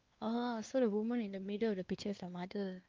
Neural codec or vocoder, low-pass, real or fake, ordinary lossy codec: codec, 16 kHz, 2 kbps, X-Codec, WavLM features, trained on Multilingual LibriSpeech; 7.2 kHz; fake; Opus, 24 kbps